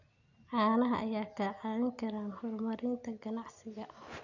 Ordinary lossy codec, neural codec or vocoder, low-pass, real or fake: none; none; 7.2 kHz; real